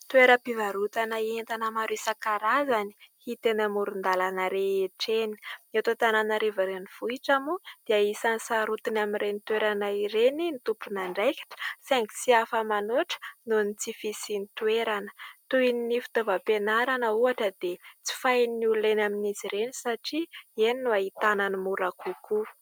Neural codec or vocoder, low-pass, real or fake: none; 19.8 kHz; real